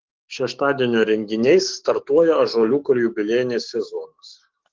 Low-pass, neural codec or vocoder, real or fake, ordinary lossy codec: 7.2 kHz; codec, 44.1 kHz, 7.8 kbps, DAC; fake; Opus, 16 kbps